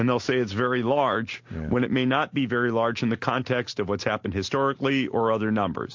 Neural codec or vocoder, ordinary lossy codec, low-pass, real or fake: none; MP3, 48 kbps; 7.2 kHz; real